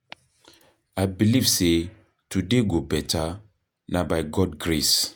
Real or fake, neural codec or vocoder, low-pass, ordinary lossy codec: fake; vocoder, 48 kHz, 128 mel bands, Vocos; none; none